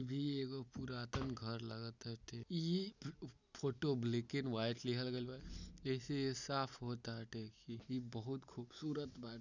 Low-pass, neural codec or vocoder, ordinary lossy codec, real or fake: 7.2 kHz; none; none; real